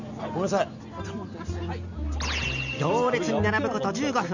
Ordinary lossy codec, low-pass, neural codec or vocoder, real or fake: none; 7.2 kHz; none; real